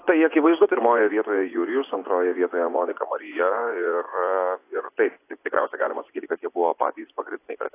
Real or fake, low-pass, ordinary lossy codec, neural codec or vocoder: fake; 3.6 kHz; AAC, 24 kbps; vocoder, 24 kHz, 100 mel bands, Vocos